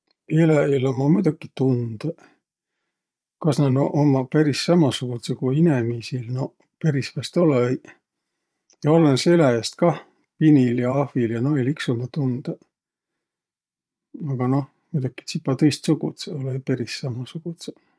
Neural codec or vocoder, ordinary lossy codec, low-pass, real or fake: vocoder, 22.05 kHz, 80 mel bands, Vocos; none; none; fake